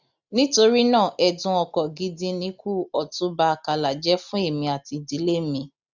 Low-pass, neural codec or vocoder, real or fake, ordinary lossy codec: 7.2 kHz; none; real; none